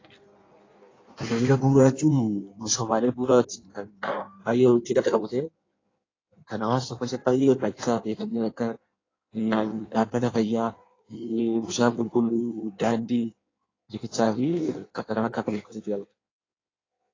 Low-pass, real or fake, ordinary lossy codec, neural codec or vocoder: 7.2 kHz; fake; AAC, 32 kbps; codec, 16 kHz in and 24 kHz out, 1.1 kbps, FireRedTTS-2 codec